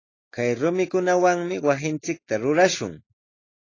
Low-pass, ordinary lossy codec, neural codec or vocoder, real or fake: 7.2 kHz; AAC, 32 kbps; none; real